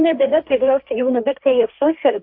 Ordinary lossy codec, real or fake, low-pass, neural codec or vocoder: AAC, 48 kbps; fake; 5.4 kHz; codec, 16 kHz, 1.1 kbps, Voila-Tokenizer